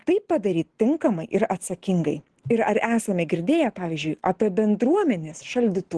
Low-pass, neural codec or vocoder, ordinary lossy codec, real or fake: 10.8 kHz; none; Opus, 16 kbps; real